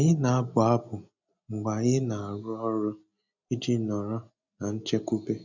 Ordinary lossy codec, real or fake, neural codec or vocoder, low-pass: none; real; none; 7.2 kHz